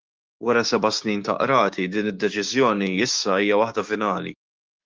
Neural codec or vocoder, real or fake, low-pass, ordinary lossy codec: codec, 16 kHz, 6 kbps, DAC; fake; 7.2 kHz; Opus, 24 kbps